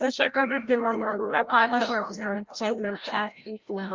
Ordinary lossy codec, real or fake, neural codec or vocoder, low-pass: Opus, 24 kbps; fake; codec, 16 kHz, 0.5 kbps, FreqCodec, larger model; 7.2 kHz